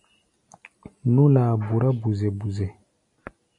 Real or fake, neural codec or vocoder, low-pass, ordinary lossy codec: real; none; 10.8 kHz; AAC, 64 kbps